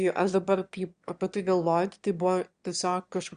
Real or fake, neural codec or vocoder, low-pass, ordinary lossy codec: fake; autoencoder, 22.05 kHz, a latent of 192 numbers a frame, VITS, trained on one speaker; 9.9 kHz; Opus, 64 kbps